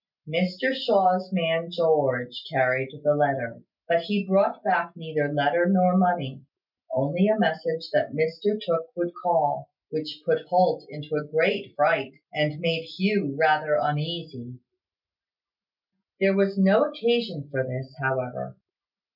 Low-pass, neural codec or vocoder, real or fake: 5.4 kHz; none; real